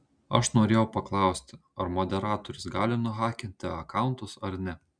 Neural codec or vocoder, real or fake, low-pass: none; real; 9.9 kHz